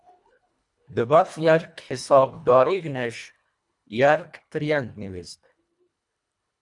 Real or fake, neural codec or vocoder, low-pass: fake; codec, 24 kHz, 1.5 kbps, HILCodec; 10.8 kHz